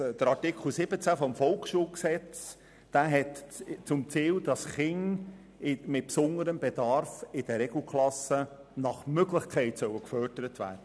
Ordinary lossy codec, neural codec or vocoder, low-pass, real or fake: none; none; none; real